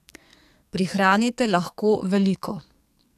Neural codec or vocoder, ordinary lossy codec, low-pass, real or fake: codec, 44.1 kHz, 2.6 kbps, SNAC; none; 14.4 kHz; fake